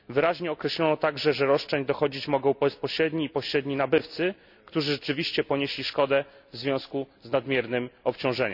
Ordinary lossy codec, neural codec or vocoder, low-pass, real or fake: none; none; 5.4 kHz; real